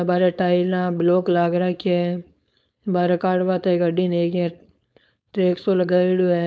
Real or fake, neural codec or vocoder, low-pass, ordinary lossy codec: fake; codec, 16 kHz, 4.8 kbps, FACodec; none; none